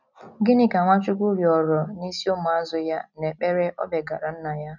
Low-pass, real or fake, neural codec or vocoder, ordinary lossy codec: 7.2 kHz; real; none; none